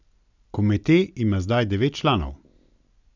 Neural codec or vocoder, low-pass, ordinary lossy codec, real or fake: none; 7.2 kHz; none; real